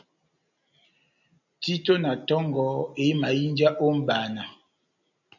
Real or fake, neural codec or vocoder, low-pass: real; none; 7.2 kHz